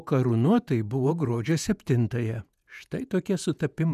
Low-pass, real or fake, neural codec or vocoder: 14.4 kHz; fake; vocoder, 48 kHz, 128 mel bands, Vocos